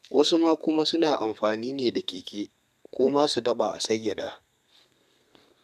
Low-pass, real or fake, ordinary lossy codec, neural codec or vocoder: 14.4 kHz; fake; none; codec, 32 kHz, 1.9 kbps, SNAC